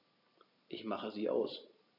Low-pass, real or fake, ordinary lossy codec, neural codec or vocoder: 5.4 kHz; real; none; none